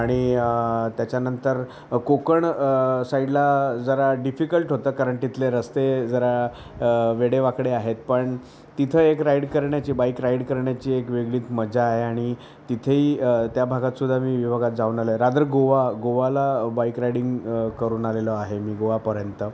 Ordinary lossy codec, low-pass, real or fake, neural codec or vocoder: none; none; real; none